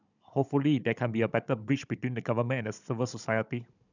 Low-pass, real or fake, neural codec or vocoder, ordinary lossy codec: 7.2 kHz; fake; codec, 16 kHz, 16 kbps, FunCodec, trained on Chinese and English, 50 frames a second; none